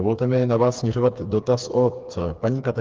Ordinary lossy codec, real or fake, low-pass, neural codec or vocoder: Opus, 32 kbps; fake; 7.2 kHz; codec, 16 kHz, 4 kbps, FreqCodec, smaller model